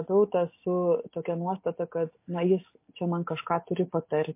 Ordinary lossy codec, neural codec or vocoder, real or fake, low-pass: MP3, 24 kbps; none; real; 3.6 kHz